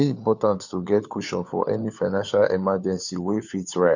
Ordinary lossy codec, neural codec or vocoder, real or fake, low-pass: none; codec, 16 kHz, 4 kbps, FunCodec, trained on LibriTTS, 50 frames a second; fake; 7.2 kHz